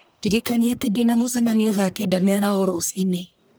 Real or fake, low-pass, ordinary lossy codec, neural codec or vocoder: fake; none; none; codec, 44.1 kHz, 1.7 kbps, Pupu-Codec